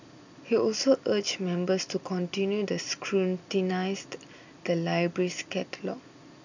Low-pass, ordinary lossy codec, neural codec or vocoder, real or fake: 7.2 kHz; none; none; real